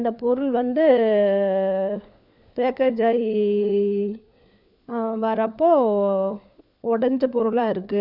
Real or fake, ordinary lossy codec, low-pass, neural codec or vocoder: fake; none; 5.4 kHz; codec, 16 kHz, 4 kbps, FunCodec, trained on LibriTTS, 50 frames a second